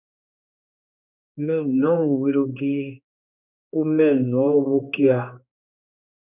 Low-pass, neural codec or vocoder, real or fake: 3.6 kHz; codec, 16 kHz, 2 kbps, X-Codec, HuBERT features, trained on general audio; fake